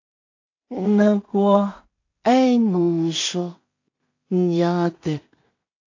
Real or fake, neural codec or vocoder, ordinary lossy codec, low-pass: fake; codec, 16 kHz in and 24 kHz out, 0.4 kbps, LongCat-Audio-Codec, two codebook decoder; AAC, 48 kbps; 7.2 kHz